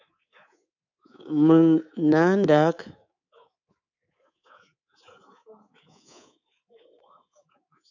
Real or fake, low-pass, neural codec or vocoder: fake; 7.2 kHz; codec, 24 kHz, 3.1 kbps, DualCodec